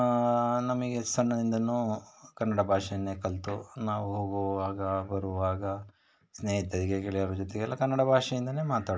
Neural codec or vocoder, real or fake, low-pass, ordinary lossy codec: none; real; none; none